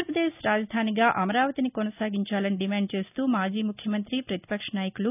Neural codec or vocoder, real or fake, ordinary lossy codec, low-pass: none; real; none; 3.6 kHz